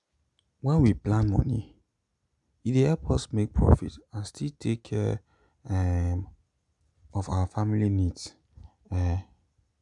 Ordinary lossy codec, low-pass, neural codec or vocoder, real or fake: none; 10.8 kHz; none; real